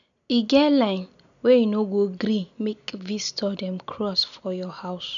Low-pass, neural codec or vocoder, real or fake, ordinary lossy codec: 7.2 kHz; none; real; none